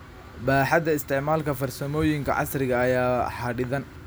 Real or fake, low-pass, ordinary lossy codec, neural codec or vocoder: real; none; none; none